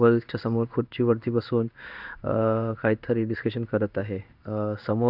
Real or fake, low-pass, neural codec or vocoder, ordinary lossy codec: fake; 5.4 kHz; codec, 16 kHz in and 24 kHz out, 1 kbps, XY-Tokenizer; none